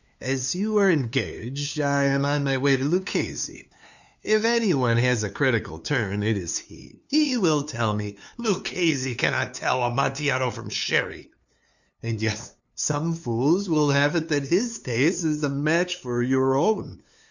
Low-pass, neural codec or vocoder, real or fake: 7.2 kHz; codec, 16 kHz, 2 kbps, FunCodec, trained on LibriTTS, 25 frames a second; fake